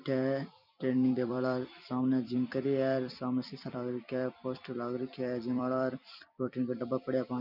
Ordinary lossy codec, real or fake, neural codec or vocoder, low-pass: none; fake; vocoder, 44.1 kHz, 128 mel bands every 256 samples, BigVGAN v2; 5.4 kHz